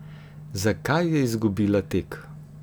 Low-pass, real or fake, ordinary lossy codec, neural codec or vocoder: none; real; none; none